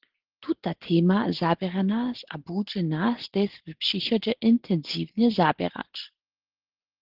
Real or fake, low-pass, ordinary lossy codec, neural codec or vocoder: real; 5.4 kHz; Opus, 16 kbps; none